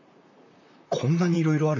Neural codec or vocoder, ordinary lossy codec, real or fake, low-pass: vocoder, 44.1 kHz, 128 mel bands, Pupu-Vocoder; none; fake; 7.2 kHz